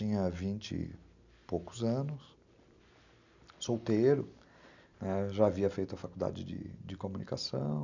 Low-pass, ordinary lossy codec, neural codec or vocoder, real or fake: 7.2 kHz; none; none; real